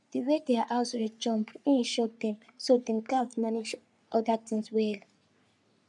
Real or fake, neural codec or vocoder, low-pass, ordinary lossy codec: fake; codec, 44.1 kHz, 3.4 kbps, Pupu-Codec; 10.8 kHz; none